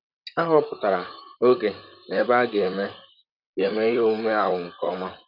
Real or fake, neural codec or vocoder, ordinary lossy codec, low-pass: fake; vocoder, 44.1 kHz, 128 mel bands, Pupu-Vocoder; none; 5.4 kHz